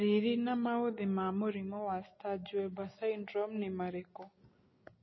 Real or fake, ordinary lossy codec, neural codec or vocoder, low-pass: real; MP3, 24 kbps; none; 7.2 kHz